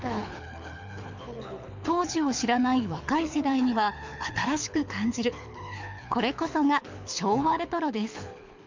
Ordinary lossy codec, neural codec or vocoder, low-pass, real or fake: MP3, 64 kbps; codec, 24 kHz, 6 kbps, HILCodec; 7.2 kHz; fake